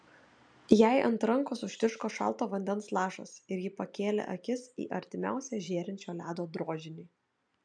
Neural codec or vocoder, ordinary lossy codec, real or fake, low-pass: none; MP3, 96 kbps; real; 9.9 kHz